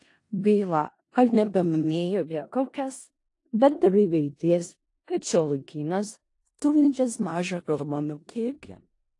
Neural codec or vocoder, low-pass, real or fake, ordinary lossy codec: codec, 16 kHz in and 24 kHz out, 0.4 kbps, LongCat-Audio-Codec, four codebook decoder; 10.8 kHz; fake; AAC, 48 kbps